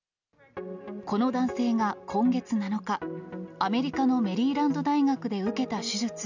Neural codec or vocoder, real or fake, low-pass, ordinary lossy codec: none; real; 7.2 kHz; none